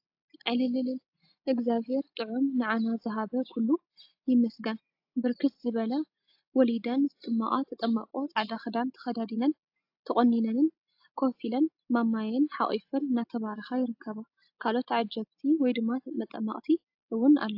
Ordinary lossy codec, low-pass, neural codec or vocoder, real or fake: AAC, 48 kbps; 5.4 kHz; none; real